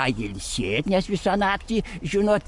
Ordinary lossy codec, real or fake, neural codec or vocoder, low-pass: MP3, 64 kbps; fake; codec, 44.1 kHz, 7.8 kbps, Pupu-Codec; 10.8 kHz